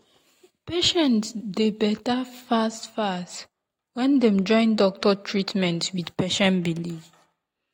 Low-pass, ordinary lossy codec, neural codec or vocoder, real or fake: 19.8 kHz; AAC, 48 kbps; none; real